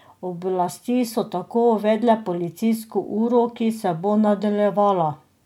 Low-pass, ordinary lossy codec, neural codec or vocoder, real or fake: 19.8 kHz; MP3, 96 kbps; none; real